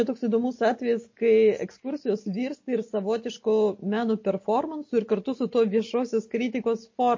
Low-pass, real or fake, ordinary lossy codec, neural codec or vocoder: 7.2 kHz; real; MP3, 32 kbps; none